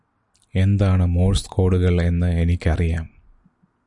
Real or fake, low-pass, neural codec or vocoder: real; 10.8 kHz; none